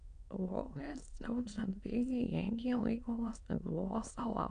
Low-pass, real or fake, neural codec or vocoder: 9.9 kHz; fake; autoencoder, 22.05 kHz, a latent of 192 numbers a frame, VITS, trained on many speakers